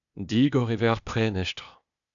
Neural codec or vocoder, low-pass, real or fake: codec, 16 kHz, 0.8 kbps, ZipCodec; 7.2 kHz; fake